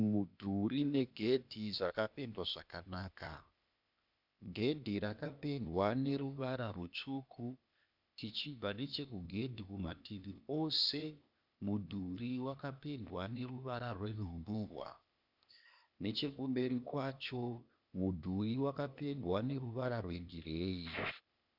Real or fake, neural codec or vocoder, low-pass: fake; codec, 16 kHz, 0.8 kbps, ZipCodec; 5.4 kHz